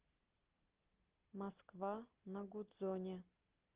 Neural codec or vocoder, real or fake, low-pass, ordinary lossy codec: none; real; 3.6 kHz; Opus, 16 kbps